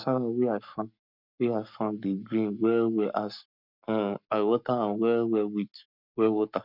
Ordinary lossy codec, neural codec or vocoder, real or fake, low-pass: none; codec, 44.1 kHz, 7.8 kbps, Pupu-Codec; fake; 5.4 kHz